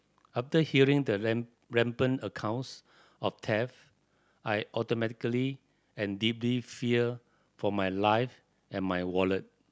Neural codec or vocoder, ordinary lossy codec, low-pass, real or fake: none; none; none; real